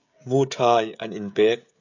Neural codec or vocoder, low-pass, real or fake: codec, 16 kHz, 16 kbps, FreqCodec, smaller model; 7.2 kHz; fake